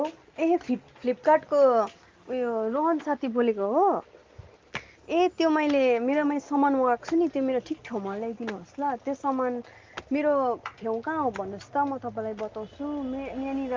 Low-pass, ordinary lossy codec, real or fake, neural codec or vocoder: 7.2 kHz; Opus, 32 kbps; real; none